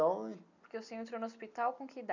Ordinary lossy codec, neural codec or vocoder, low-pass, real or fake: none; none; 7.2 kHz; real